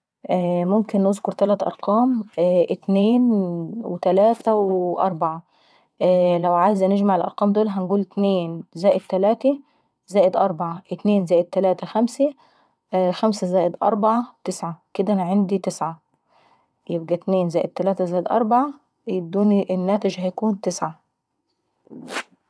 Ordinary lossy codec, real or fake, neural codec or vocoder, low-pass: none; fake; vocoder, 22.05 kHz, 80 mel bands, WaveNeXt; none